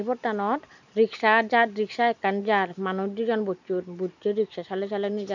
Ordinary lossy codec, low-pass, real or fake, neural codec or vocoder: none; 7.2 kHz; real; none